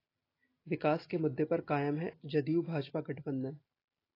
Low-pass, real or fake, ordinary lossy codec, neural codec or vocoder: 5.4 kHz; real; AAC, 24 kbps; none